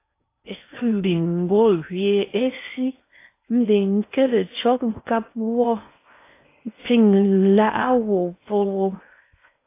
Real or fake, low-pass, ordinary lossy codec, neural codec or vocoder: fake; 3.6 kHz; AAC, 24 kbps; codec, 16 kHz in and 24 kHz out, 0.6 kbps, FocalCodec, streaming, 4096 codes